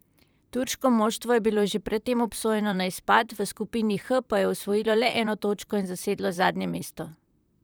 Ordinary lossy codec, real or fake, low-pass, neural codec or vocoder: none; fake; none; vocoder, 44.1 kHz, 128 mel bands, Pupu-Vocoder